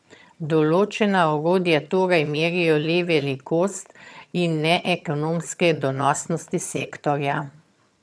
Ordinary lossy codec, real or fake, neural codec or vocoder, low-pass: none; fake; vocoder, 22.05 kHz, 80 mel bands, HiFi-GAN; none